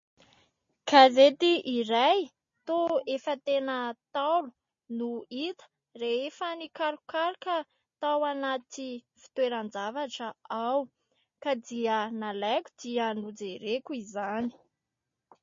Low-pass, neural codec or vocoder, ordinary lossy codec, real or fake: 7.2 kHz; none; MP3, 32 kbps; real